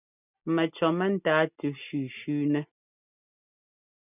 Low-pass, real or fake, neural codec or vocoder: 3.6 kHz; real; none